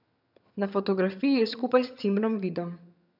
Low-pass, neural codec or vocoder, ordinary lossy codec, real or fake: 5.4 kHz; vocoder, 22.05 kHz, 80 mel bands, HiFi-GAN; none; fake